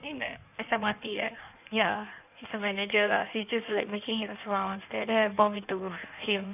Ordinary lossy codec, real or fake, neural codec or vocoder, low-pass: none; fake; codec, 16 kHz in and 24 kHz out, 1.1 kbps, FireRedTTS-2 codec; 3.6 kHz